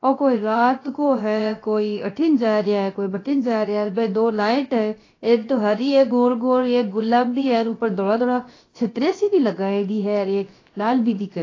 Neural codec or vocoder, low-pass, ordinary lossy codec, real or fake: codec, 16 kHz, about 1 kbps, DyCAST, with the encoder's durations; 7.2 kHz; AAC, 32 kbps; fake